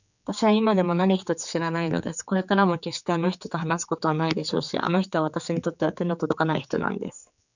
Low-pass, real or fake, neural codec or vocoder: 7.2 kHz; fake; codec, 16 kHz, 4 kbps, X-Codec, HuBERT features, trained on general audio